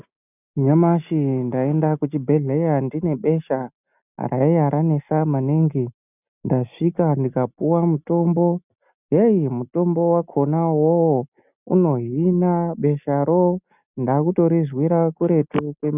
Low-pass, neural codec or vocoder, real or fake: 3.6 kHz; none; real